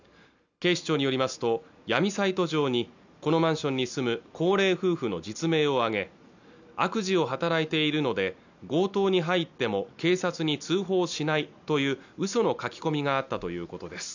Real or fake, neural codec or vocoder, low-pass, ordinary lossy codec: real; none; 7.2 kHz; none